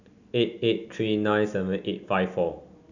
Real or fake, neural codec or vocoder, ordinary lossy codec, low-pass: real; none; none; 7.2 kHz